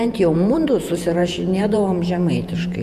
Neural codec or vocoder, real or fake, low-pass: vocoder, 48 kHz, 128 mel bands, Vocos; fake; 14.4 kHz